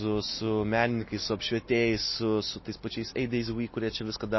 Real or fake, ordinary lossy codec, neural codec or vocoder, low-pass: real; MP3, 24 kbps; none; 7.2 kHz